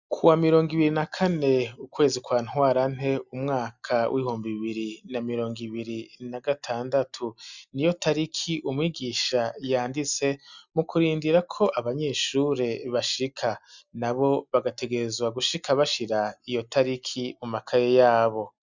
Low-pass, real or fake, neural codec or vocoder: 7.2 kHz; real; none